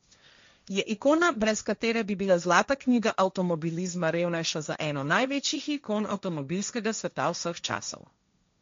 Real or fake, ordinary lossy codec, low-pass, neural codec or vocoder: fake; MP3, 48 kbps; 7.2 kHz; codec, 16 kHz, 1.1 kbps, Voila-Tokenizer